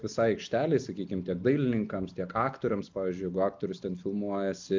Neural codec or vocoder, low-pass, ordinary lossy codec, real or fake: none; 7.2 kHz; MP3, 64 kbps; real